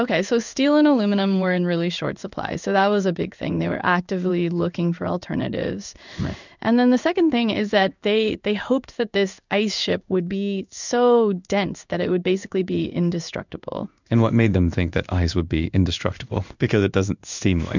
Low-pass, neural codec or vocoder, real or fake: 7.2 kHz; codec, 16 kHz in and 24 kHz out, 1 kbps, XY-Tokenizer; fake